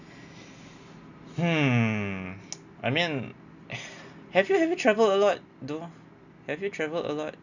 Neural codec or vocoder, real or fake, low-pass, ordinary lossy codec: none; real; 7.2 kHz; none